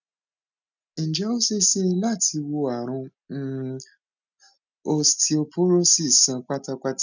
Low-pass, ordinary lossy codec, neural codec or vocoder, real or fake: 7.2 kHz; none; none; real